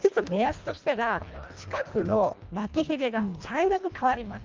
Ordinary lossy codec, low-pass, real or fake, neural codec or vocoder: Opus, 24 kbps; 7.2 kHz; fake; codec, 24 kHz, 1.5 kbps, HILCodec